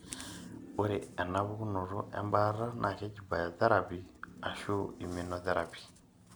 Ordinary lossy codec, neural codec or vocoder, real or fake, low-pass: none; none; real; none